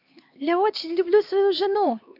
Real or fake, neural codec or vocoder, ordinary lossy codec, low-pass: fake; codec, 16 kHz, 2 kbps, X-Codec, HuBERT features, trained on LibriSpeech; AAC, 48 kbps; 5.4 kHz